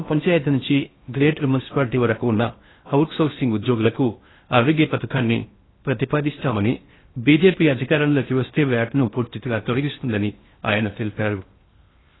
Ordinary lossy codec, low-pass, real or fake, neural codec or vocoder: AAC, 16 kbps; 7.2 kHz; fake; codec, 16 kHz in and 24 kHz out, 0.6 kbps, FocalCodec, streaming, 4096 codes